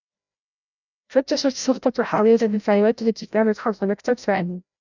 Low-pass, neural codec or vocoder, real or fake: 7.2 kHz; codec, 16 kHz, 0.5 kbps, FreqCodec, larger model; fake